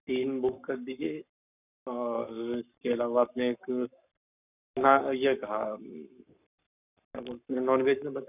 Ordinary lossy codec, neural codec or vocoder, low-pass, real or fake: none; codec, 44.1 kHz, 7.8 kbps, Pupu-Codec; 3.6 kHz; fake